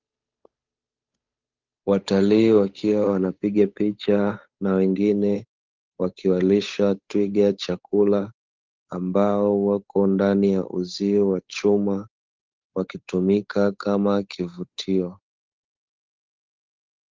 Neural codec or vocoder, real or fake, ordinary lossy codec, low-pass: codec, 16 kHz, 8 kbps, FunCodec, trained on Chinese and English, 25 frames a second; fake; Opus, 24 kbps; 7.2 kHz